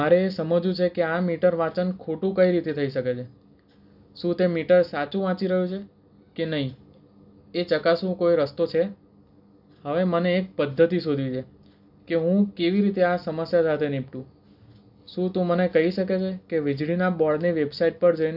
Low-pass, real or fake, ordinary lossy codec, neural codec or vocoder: 5.4 kHz; real; none; none